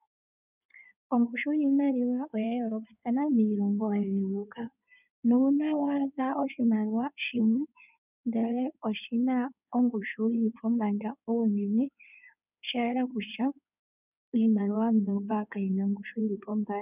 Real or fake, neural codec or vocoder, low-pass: fake; codec, 16 kHz in and 24 kHz out, 2.2 kbps, FireRedTTS-2 codec; 3.6 kHz